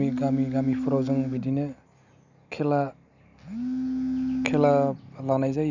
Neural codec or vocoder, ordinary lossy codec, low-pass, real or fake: none; none; 7.2 kHz; real